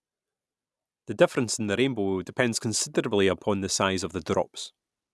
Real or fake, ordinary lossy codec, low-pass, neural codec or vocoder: real; none; none; none